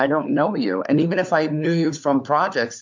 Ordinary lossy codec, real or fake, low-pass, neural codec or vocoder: MP3, 64 kbps; fake; 7.2 kHz; codec, 16 kHz, 4 kbps, FunCodec, trained on LibriTTS, 50 frames a second